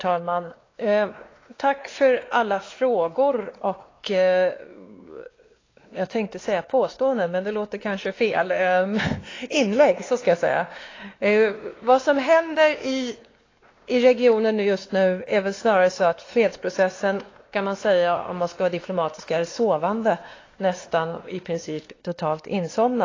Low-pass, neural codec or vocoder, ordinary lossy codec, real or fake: 7.2 kHz; codec, 16 kHz, 2 kbps, X-Codec, WavLM features, trained on Multilingual LibriSpeech; AAC, 32 kbps; fake